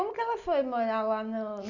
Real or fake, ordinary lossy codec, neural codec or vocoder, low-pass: real; none; none; 7.2 kHz